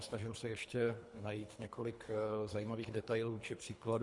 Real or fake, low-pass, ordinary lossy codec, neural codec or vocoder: fake; 10.8 kHz; MP3, 64 kbps; codec, 24 kHz, 3 kbps, HILCodec